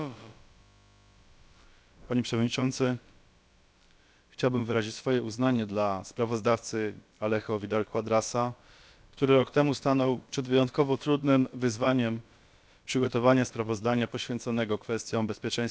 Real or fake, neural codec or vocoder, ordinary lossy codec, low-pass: fake; codec, 16 kHz, about 1 kbps, DyCAST, with the encoder's durations; none; none